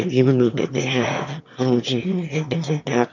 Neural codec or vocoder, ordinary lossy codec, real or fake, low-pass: autoencoder, 22.05 kHz, a latent of 192 numbers a frame, VITS, trained on one speaker; MP3, 64 kbps; fake; 7.2 kHz